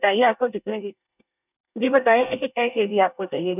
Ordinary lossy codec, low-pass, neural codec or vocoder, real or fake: none; 3.6 kHz; codec, 24 kHz, 1 kbps, SNAC; fake